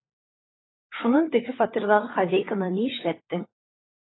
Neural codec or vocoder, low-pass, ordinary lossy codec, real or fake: codec, 16 kHz, 16 kbps, FunCodec, trained on LibriTTS, 50 frames a second; 7.2 kHz; AAC, 16 kbps; fake